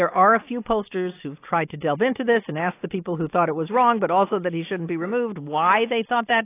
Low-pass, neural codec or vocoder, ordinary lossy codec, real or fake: 3.6 kHz; none; AAC, 24 kbps; real